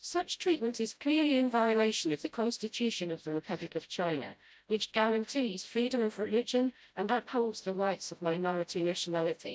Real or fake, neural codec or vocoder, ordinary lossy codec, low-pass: fake; codec, 16 kHz, 0.5 kbps, FreqCodec, smaller model; none; none